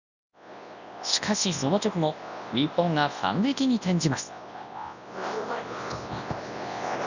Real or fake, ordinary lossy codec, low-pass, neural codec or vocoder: fake; none; 7.2 kHz; codec, 24 kHz, 0.9 kbps, WavTokenizer, large speech release